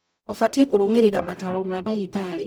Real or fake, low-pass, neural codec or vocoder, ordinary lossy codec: fake; none; codec, 44.1 kHz, 0.9 kbps, DAC; none